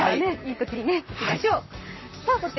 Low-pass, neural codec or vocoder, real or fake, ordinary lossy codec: 7.2 kHz; vocoder, 44.1 kHz, 128 mel bands, Pupu-Vocoder; fake; MP3, 24 kbps